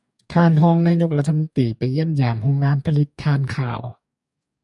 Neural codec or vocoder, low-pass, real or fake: codec, 44.1 kHz, 2.6 kbps, DAC; 10.8 kHz; fake